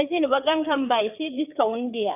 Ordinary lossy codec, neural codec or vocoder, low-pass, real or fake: none; codec, 16 kHz, 4 kbps, FreqCodec, larger model; 3.6 kHz; fake